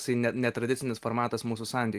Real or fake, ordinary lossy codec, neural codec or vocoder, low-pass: real; Opus, 24 kbps; none; 14.4 kHz